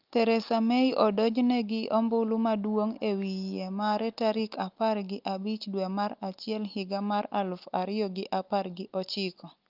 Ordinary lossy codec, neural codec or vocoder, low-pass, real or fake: Opus, 32 kbps; none; 5.4 kHz; real